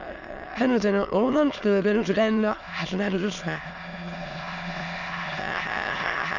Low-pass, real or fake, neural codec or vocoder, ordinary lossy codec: 7.2 kHz; fake; autoencoder, 22.05 kHz, a latent of 192 numbers a frame, VITS, trained on many speakers; none